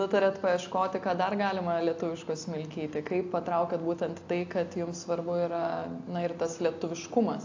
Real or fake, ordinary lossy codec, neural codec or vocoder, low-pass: real; AAC, 48 kbps; none; 7.2 kHz